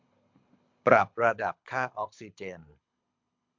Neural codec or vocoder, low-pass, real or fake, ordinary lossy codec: codec, 24 kHz, 6 kbps, HILCodec; 7.2 kHz; fake; MP3, 64 kbps